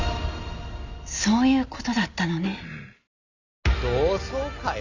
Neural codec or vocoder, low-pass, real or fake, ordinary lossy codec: none; 7.2 kHz; real; none